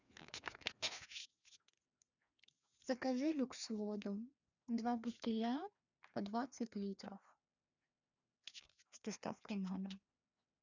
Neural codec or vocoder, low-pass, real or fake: codec, 16 kHz, 2 kbps, FreqCodec, larger model; 7.2 kHz; fake